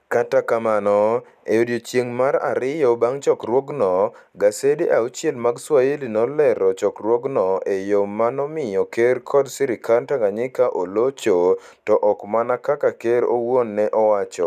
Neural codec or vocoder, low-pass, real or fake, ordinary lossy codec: none; 14.4 kHz; real; none